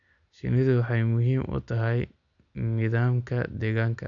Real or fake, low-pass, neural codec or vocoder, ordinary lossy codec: real; 7.2 kHz; none; none